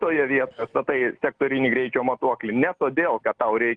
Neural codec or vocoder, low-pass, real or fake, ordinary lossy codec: none; 9.9 kHz; real; Opus, 24 kbps